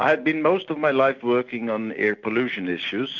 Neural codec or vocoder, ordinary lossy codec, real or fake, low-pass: none; MP3, 64 kbps; real; 7.2 kHz